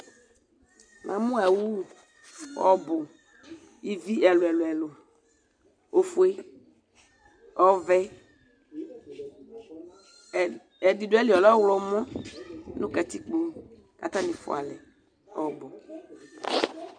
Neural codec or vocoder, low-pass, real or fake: none; 9.9 kHz; real